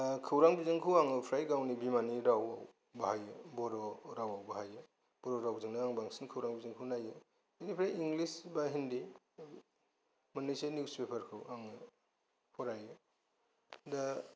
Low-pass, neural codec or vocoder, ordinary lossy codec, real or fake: none; none; none; real